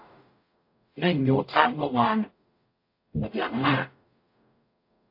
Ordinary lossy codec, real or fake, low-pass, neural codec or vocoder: AAC, 32 kbps; fake; 5.4 kHz; codec, 44.1 kHz, 0.9 kbps, DAC